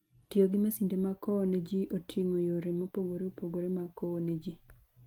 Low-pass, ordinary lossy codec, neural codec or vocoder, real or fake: 19.8 kHz; Opus, 32 kbps; none; real